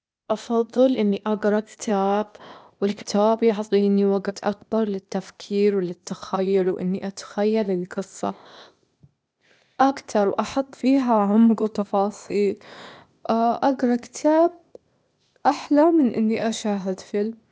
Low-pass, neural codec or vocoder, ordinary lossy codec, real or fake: none; codec, 16 kHz, 0.8 kbps, ZipCodec; none; fake